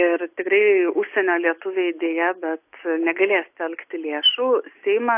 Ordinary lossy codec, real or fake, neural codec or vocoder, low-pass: AAC, 32 kbps; real; none; 3.6 kHz